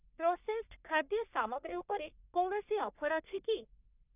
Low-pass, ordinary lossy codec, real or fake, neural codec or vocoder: 3.6 kHz; none; fake; codec, 44.1 kHz, 1.7 kbps, Pupu-Codec